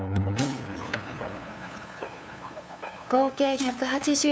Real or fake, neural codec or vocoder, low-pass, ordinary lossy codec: fake; codec, 16 kHz, 2 kbps, FunCodec, trained on LibriTTS, 25 frames a second; none; none